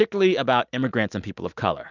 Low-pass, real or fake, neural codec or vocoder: 7.2 kHz; real; none